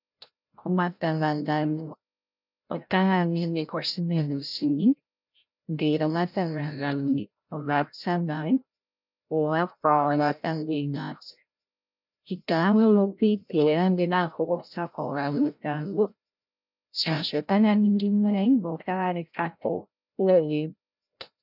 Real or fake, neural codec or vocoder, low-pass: fake; codec, 16 kHz, 0.5 kbps, FreqCodec, larger model; 5.4 kHz